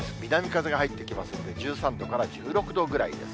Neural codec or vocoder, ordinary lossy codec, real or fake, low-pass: none; none; real; none